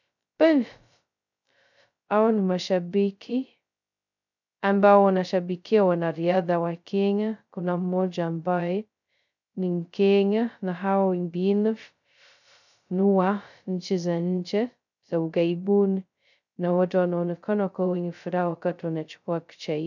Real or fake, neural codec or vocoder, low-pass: fake; codec, 16 kHz, 0.2 kbps, FocalCodec; 7.2 kHz